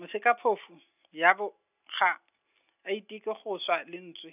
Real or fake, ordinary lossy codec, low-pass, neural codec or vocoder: real; none; 3.6 kHz; none